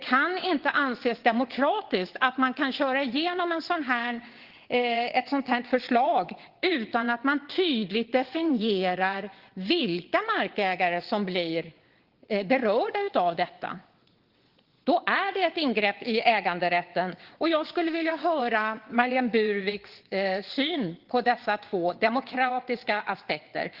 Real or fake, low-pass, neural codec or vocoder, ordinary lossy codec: fake; 5.4 kHz; vocoder, 22.05 kHz, 80 mel bands, WaveNeXt; Opus, 16 kbps